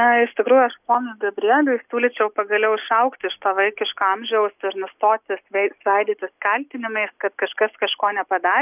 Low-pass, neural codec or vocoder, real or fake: 3.6 kHz; none; real